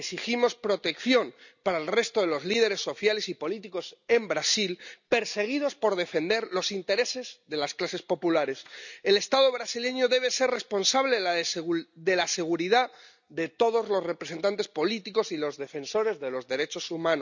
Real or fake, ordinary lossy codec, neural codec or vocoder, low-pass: real; none; none; 7.2 kHz